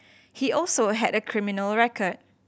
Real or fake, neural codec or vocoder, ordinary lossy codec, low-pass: real; none; none; none